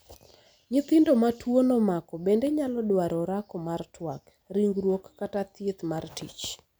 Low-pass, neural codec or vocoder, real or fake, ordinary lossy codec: none; none; real; none